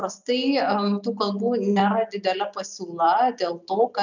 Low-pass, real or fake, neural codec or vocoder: 7.2 kHz; real; none